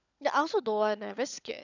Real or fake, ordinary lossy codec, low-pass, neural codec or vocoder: fake; none; 7.2 kHz; codec, 16 kHz, 16 kbps, FunCodec, trained on LibriTTS, 50 frames a second